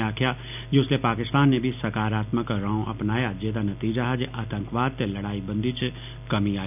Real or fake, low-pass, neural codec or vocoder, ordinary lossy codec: real; 3.6 kHz; none; none